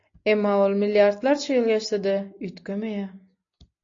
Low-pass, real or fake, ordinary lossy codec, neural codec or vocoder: 7.2 kHz; real; AAC, 48 kbps; none